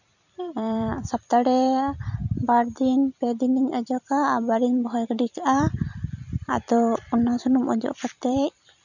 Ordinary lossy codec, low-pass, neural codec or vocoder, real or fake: none; 7.2 kHz; vocoder, 44.1 kHz, 128 mel bands every 256 samples, BigVGAN v2; fake